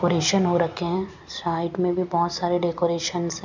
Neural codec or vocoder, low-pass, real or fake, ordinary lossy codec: none; 7.2 kHz; real; none